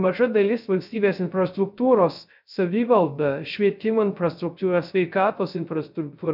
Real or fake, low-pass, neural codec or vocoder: fake; 5.4 kHz; codec, 16 kHz, 0.3 kbps, FocalCodec